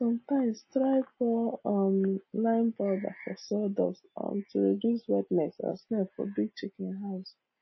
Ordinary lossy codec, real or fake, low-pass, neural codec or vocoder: MP3, 24 kbps; real; 7.2 kHz; none